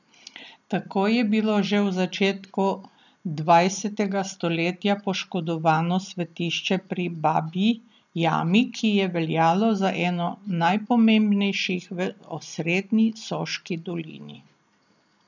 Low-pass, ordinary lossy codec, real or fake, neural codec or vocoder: 7.2 kHz; none; real; none